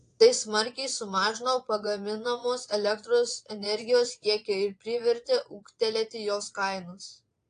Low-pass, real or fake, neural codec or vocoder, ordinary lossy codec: 9.9 kHz; fake; vocoder, 22.05 kHz, 80 mel bands, Vocos; AAC, 48 kbps